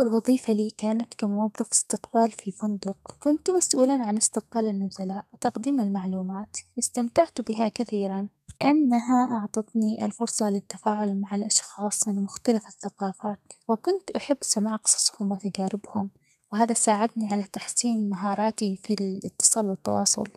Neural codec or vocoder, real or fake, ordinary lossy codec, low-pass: codec, 32 kHz, 1.9 kbps, SNAC; fake; none; 14.4 kHz